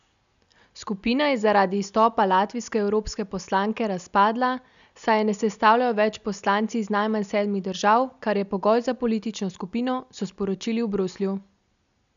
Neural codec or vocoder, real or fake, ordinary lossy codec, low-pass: none; real; none; 7.2 kHz